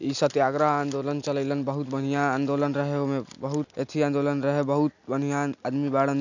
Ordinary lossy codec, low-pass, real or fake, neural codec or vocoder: none; 7.2 kHz; real; none